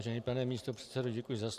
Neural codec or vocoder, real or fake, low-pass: none; real; 14.4 kHz